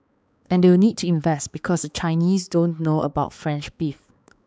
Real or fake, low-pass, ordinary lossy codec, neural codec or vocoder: fake; none; none; codec, 16 kHz, 4 kbps, X-Codec, HuBERT features, trained on balanced general audio